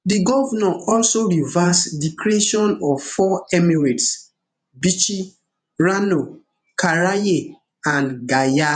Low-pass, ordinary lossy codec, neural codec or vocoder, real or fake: 9.9 kHz; none; vocoder, 48 kHz, 128 mel bands, Vocos; fake